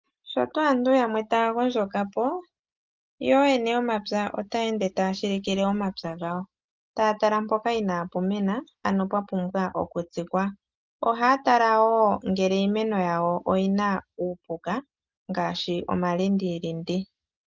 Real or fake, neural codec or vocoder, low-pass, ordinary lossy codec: real; none; 7.2 kHz; Opus, 24 kbps